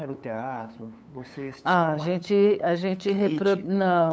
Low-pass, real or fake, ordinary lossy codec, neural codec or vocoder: none; fake; none; codec, 16 kHz, 8 kbps, FunCodec, trained on LibriTTS, 25 frames a second